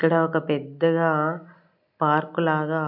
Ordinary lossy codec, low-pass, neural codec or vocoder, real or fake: none; 5.4 kHz; none; real